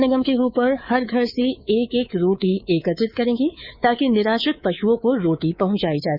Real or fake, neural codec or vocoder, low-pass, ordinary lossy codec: fake; codec, 24 kHz, 3.1 kbps, DualCodec; 5.4 kHz; Opus, 64 kbps